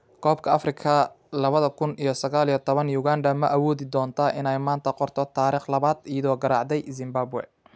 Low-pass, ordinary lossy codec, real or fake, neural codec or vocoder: none; none; real; none